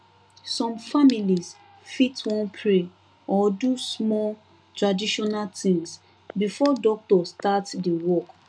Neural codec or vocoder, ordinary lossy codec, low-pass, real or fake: none; none; 9.9 kHz; real